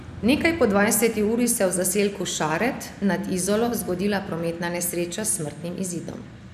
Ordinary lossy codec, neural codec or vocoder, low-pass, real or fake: none; none; 14.4 kHz; real